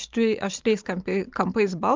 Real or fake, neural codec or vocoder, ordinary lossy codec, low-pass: fake; vocoder, 44.1 kHz, 128 mel bands every 512 samples, BigVGAN v2; Opus, 24 kbps; 7.2 kHz